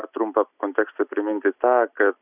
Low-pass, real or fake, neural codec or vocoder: 3.6 kHz; real; none